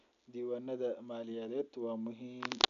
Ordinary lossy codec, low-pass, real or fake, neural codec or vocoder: none; 7.2 kHz; real; none